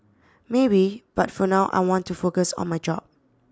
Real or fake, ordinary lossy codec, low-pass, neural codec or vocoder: real; none; none; none